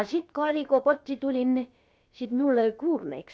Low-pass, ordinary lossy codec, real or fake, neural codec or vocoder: none; none; fake; codec, 16 kHz, about 1 kbps, DyCAST, with the encoder's durations